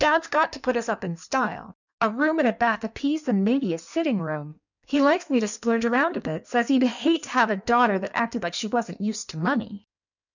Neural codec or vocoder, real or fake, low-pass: codec, 16 kHz in and 24 kHz out, 1.1 kbps, FireRedTTS-2 codec; fake; 7.2 kHz